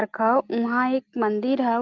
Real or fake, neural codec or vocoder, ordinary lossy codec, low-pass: real; none; Opus, 32 kbps; 7.2 kHz